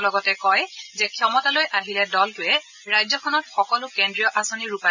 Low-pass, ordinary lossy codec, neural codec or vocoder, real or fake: 7.2 kHz; none; none; real